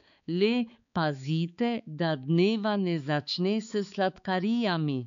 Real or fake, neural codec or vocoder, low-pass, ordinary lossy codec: fake; codec, 16 kHz, 4 kbps, X-Codec, HuBERT features, trained on balanced general audio; 7.2 kHz; none